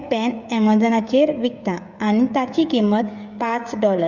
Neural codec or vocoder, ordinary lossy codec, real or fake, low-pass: codec, 16 kHz, 16 kbps, FreqCodec, smaller model; Opus, 64 kbps; fake; 7.2 kHz